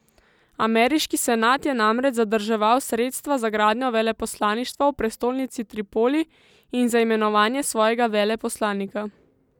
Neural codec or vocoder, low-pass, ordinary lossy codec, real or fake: none; 19.8 kHz; none; real